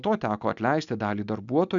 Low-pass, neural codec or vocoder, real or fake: 7.2 kHz; none; real